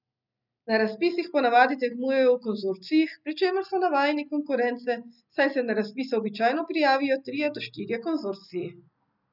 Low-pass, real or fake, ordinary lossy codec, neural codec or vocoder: 5.4 kHz; real; none; none